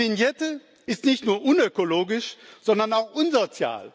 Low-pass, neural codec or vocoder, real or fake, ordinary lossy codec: none; none; real; none